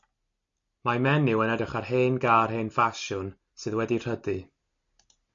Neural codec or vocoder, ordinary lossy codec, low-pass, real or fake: none; MP3, 48 kbps; 7.2 kHz; real